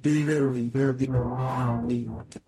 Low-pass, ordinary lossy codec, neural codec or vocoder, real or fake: 19.8 kHz; MP3, 64 kbps; codec, 44.1 kHz, 0.9 kbps, DAC; fake